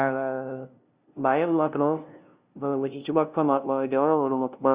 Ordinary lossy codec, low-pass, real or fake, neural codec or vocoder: Opus, 64 kbps; 3.6 kHz; fake; codec, 16 kHz, 0.5 kbps, FunCodec, trained on LibriTTS, 25 frames a second